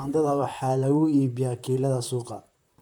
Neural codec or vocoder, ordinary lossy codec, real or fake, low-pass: vocoder, 44.1 kHz, 128 mel bands, Pupu-Vocoder; none; fake; 19.8 kHz